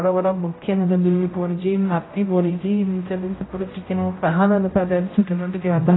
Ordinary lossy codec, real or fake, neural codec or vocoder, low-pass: AAC, 16 kbps; fake; codec, 16 kHz, 0.5 kbps, X-Codec, HuBERT features, trained on general audio; 7.2 kHz